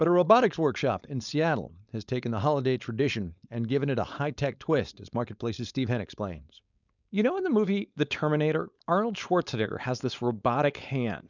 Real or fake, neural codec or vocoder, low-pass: fake; codec, 16 kHz, 4.8 kbps, FACodec; 7.2 kHz